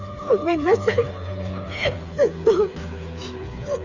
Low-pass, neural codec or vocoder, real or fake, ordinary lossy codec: 7.2 kHz; codec, 16 kHz, 4 kbps, FreqCodec, smaller model; fake; Opus, 64 kbps